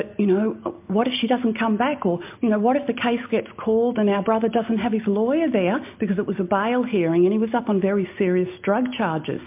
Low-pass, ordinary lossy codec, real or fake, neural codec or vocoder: 3.6 kHz; MP3, 32 kbps; real; none